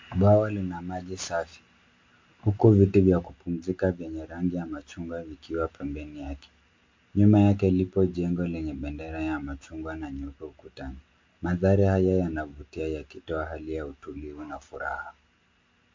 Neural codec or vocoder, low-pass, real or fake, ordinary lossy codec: none; 7.2 kHz; real; MP3, 48 kbps